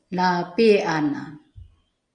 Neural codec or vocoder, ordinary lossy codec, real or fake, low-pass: none; Opus, 64 kbps; real; 9.9 kHz